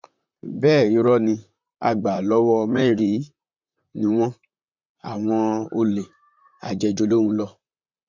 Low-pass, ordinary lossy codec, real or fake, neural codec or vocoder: 7.2 kHz; MP3, 64 kbps; fake; vocoder, 44.1 kHz, 128 mel bands, Pupu-Vocoder